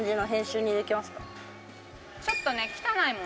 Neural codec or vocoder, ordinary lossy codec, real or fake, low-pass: none; none; real; none